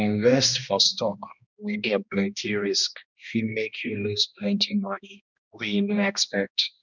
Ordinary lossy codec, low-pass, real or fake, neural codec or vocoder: none; 7.2 kHz; fake; codec, 16 kHz, 1 kbps, X-Codec, HuBERT features, trained on balanced general audio